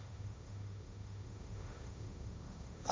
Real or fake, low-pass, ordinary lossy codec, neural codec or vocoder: fake; none; none; codec, 16 kHz, 1.1 kbps, Voila-Tokenizer